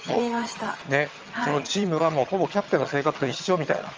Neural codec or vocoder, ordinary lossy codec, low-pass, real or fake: vocoder, 22.05 kHz, 80 mel bands, HiFi-GAN; Opus, 24 kbps; 7.2 kHz; fake